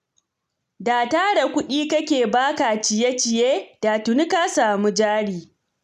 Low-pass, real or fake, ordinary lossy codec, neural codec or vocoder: 14.4 kHz; real; none; none